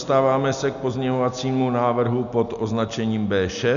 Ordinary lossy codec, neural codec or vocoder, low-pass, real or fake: MP3, 96 kbps; none; 7.2 kHz; real